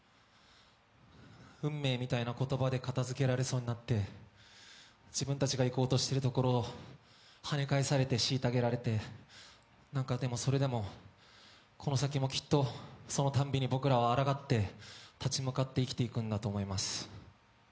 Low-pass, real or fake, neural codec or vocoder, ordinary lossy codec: none; real; none; none